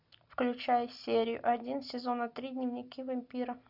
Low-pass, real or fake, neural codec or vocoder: 5.4 kHz; real; none